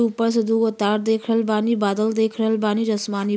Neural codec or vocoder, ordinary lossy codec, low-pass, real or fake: none; none; none; real